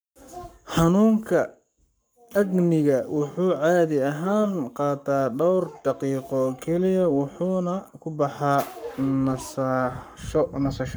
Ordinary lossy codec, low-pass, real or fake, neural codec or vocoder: none; none; fake; codec, 44.1 kHz, 7.8 kbps, Pupu-Codec